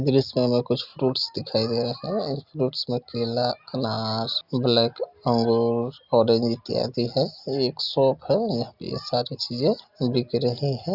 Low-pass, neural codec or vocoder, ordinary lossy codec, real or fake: 5.4 kHz; none; Opus, 64 kbps; real